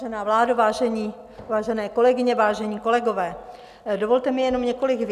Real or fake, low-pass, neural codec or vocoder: real; 14.4 kHz; none